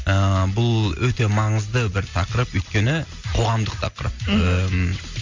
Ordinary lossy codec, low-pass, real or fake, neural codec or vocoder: MP3, 64 kbps; 7.2 kHz; real; none